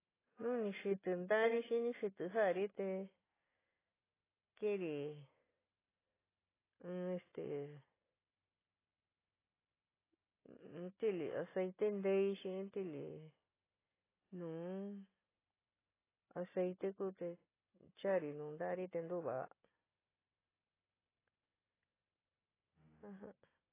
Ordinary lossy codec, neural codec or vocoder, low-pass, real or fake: AAC, 16 kbps; none; 3.6 kHz; real